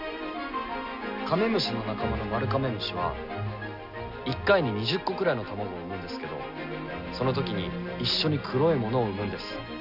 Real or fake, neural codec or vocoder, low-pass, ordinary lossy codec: real; none; 5.4 kHz; none